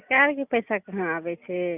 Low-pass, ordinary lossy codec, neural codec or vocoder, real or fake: 3.6 kHz; none; none; real